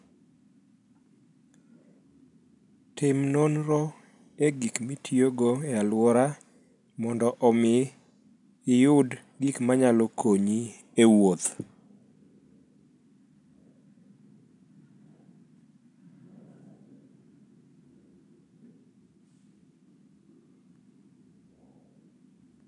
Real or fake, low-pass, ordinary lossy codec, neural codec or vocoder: real; 10.8 kHz; none; none